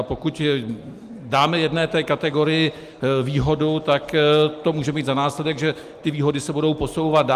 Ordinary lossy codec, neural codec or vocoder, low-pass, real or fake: Opus, 32 kbps; none; 14.4 kHz; real